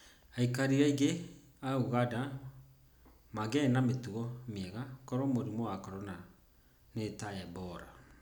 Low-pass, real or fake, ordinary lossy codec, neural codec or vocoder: none; real; none; none